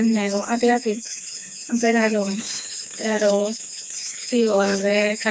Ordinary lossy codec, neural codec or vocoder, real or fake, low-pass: none; codec, 16 kHz, 2 kbps, FreqCodec, smaller model; fake; none